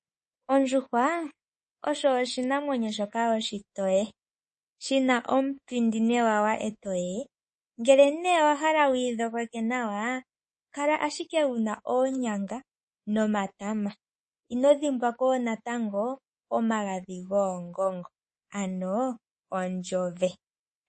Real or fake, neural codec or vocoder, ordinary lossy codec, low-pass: fake; codec, 24 kHz, 3.1 kbps, DualCodec; MP3, 32 kbps; 10.8 kHz